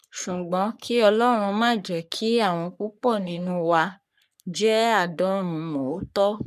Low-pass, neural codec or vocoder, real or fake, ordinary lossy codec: 14.4 kHz; codec, 44.1 kHz, 3.4 kbps, Pupu-Codec; fake; none